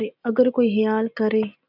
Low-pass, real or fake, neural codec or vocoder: 5.4 kHz; real; none